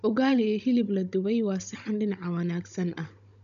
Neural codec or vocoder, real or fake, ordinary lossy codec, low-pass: codec, 16 kHz, 16 kbps, FunCodec, trained on Chinese and English, 50 frames a second; fake; none; 7.2 kHz